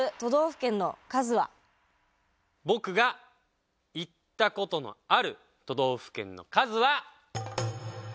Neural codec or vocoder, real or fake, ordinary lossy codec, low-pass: none; real; none; none